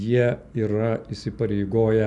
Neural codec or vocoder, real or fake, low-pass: none; real; 10.8 kHz